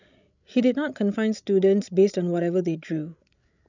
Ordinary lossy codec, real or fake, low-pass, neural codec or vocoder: none; fake; 7.2 kHz; codec, 16 kHz, 16 kbps, FreqCodec, larger model